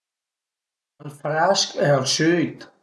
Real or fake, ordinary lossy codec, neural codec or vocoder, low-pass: real; none; none; none